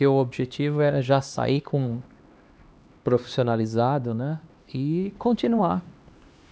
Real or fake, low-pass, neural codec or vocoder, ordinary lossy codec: fake; none; codec, 16 kHz, 2 kbps, X-Codec, HuBERT features, trained on LibriSpeech; none